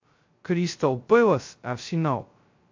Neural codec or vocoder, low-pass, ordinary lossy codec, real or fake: codec, 16 kHz, 0.2 kbps, FocalCodec; 7.2 kHz; MP3, 48 kbps; fake